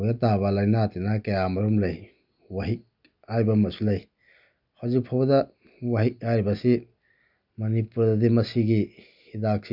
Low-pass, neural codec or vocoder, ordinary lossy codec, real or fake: 5.4 kHz; none; none; real